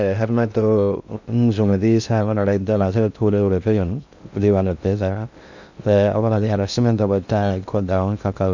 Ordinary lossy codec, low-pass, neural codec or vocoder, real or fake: none; 7.2 kHz; codec, 16 kHz in and 24 kHz out, 0.6 kbps, FocalCodec, streaming, 2048 codes; fake